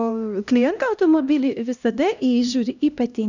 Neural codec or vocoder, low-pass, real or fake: codec, 16 kHz, 1 kbps, X-Codec, HuBERT features, trained on LibriSpeech; 7.2 kHz; fake